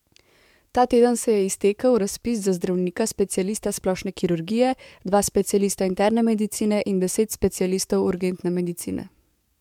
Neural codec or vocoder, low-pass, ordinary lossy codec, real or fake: codec, 44.1 kHz, 7.8 kbps, DAC; 19.8 kHz; MP3, 96 kbps; fake